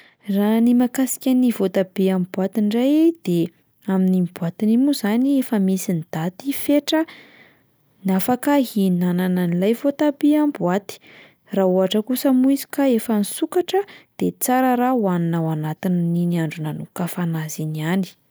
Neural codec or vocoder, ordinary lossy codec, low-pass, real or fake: none; none; none; real